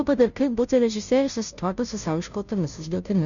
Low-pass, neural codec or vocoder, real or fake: 7.2 kHz; codec, 16 kHz, 0.5 kbps, FunCodec, trained on Chinese and English, 25 frames a second; fake